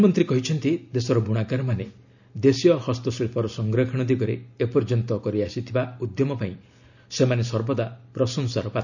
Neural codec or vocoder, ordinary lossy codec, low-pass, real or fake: none; none; 7.2 kHz; real